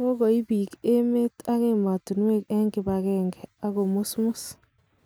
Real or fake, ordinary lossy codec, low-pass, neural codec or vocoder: real; none; none; none